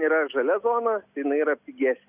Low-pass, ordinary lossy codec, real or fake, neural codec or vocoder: 3.6 kHz; Opus, 64 kbps; real; none